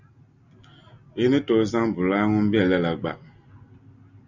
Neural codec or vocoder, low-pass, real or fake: none; 7.2 kHz; real